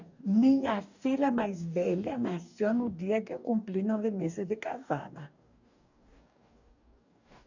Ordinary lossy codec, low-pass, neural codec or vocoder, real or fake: none; 7.2 kHz; codec, 44.1 kHz, 2.6 kbps, DAC; fake